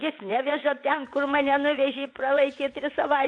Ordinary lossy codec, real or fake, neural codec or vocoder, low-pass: AAC, 64 kbps; fake; vocoder, 22.05 kHz, 80 mel bands, WaveNeXt; 9.9 kHz